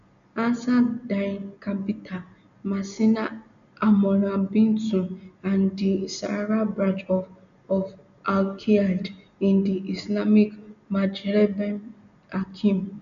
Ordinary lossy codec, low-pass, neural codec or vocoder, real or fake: none; 7.2 kHz; none; real